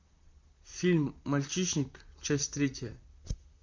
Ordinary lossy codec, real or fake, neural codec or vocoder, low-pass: MP3, 64 kbps; fake; vocoder, 44.1 kHz, 128 mel bands, Pupu-Vocoder; 7.2 kHz